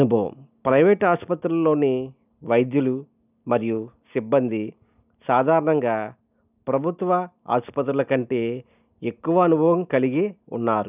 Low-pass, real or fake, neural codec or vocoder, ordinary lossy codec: 3.6 kHz; real; none; none